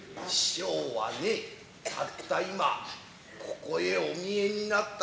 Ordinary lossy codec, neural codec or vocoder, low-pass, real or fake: none; none; none; real